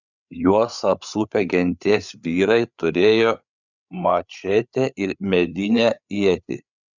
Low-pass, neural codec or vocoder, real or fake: 7.2 kHz; codec, 16 kHz, 8 kbps, FreqCodec, larger model; fake